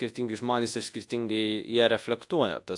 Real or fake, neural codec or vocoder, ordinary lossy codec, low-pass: fake; codec, 24 kHz, 0.9 kbps, WavTokenizer, large speech release; AAC, 64 kbps; 10.8 kHz